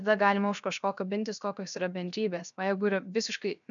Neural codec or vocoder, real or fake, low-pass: codec, 16 kHz, 0.7 kbps, FocalCodec; fake; 7.2 kHz